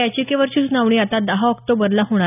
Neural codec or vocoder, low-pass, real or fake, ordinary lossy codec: none; 3.6 kHz; real; none